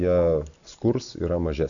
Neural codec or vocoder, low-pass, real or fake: none; 7.2 kHz; real